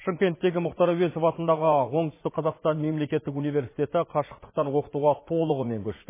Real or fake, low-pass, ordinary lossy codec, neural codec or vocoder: fake; 3.6 kHz; MP3, 16 kbps; vocoder, 44.1 kHz, 80 mel bands, Vocos